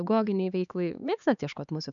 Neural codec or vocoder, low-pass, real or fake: codec, 16 kHz, 4 kbps, X-Codec, HuBERT features, trained on LibriSpeech; 7.2 kHz; fake